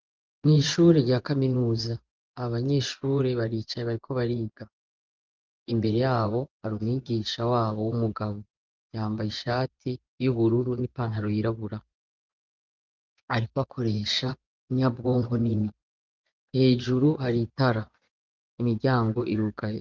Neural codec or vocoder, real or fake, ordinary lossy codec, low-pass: vocoder, 24 kHz, 100 mel bands, Vocos; fake; Opus, 32 kbps; 7.2 kHz